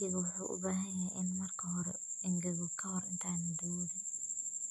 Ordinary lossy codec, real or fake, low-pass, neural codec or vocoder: none; real; 14.4 kHz; none